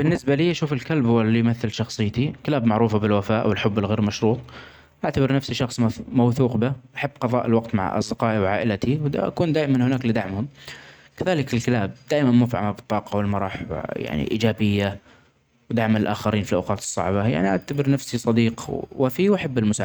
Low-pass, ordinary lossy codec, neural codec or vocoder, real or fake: none; none; none; real